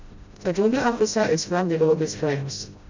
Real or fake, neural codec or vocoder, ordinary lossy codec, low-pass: fake; codec, 16 kHz, 0.5 kbps, FreqCodec, smaller model; MP3, 64 kbps; 7.2 kHz